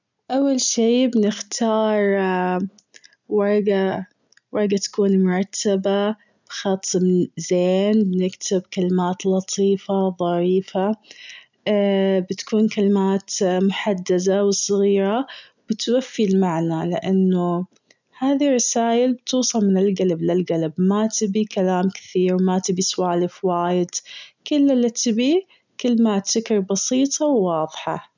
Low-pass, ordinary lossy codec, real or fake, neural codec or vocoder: 7.2 kHz; none; real; none